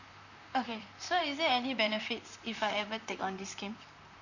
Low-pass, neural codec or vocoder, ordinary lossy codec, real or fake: 7.2 kHz; vocoder, 44.1 kHz, 128 mel bands, Pupu-Vocoder; none; fake